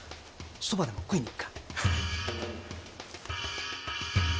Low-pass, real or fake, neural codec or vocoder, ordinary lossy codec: none; real; none; none